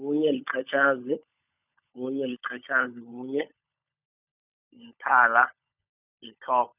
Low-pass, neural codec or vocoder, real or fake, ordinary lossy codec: 3.6 kHz; codec, 16 kHz, 16 kbps, FunCodec, trained on LibriTTS, 50 frames a second; fake; none